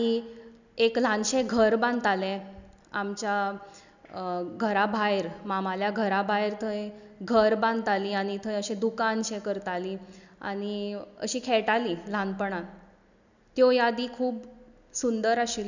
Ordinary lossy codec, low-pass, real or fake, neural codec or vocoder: none; 7.2 kHz; real; none